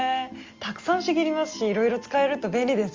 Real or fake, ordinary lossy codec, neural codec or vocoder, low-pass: real; Opus, 32 kbps; none; 7.2 kHz